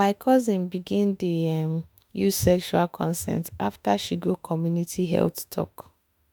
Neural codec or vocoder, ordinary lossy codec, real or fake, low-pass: autoencoder, 48 kHz, 32 numbers a frame, DAC-VAE, trained on Japanese speech; none; fake; none